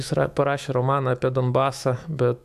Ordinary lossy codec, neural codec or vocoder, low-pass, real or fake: AAC, 96 kbps; autoencoder, 48 kHz, 128 numbers a frame, DAC-VAE, trained on Japanese speech; 14.4 kHz; fake